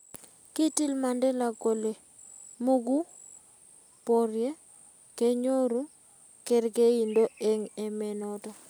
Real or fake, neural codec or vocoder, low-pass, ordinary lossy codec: real; none; none; none